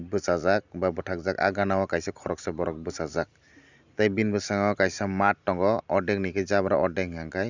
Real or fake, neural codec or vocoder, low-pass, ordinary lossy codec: real; none; 7.2 kHz; Opus, 64 kbps